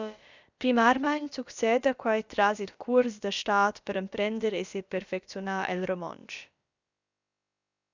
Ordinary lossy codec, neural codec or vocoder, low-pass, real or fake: Opus, 64 kbps; codec, 16 kHz, about 1 kbps, DyCAST, with the encoder's durations; 7.2 kHz; fake